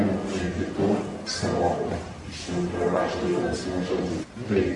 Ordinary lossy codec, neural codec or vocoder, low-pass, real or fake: AAC, 64 kbps; codec, 44.1 kHz, 1.7 kbps, Pupu-Codec; 10.8 kHz; fake